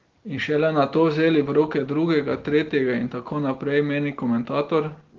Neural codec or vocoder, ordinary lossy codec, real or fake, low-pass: none; Opus, 16 kbps; real; 7.2 kHz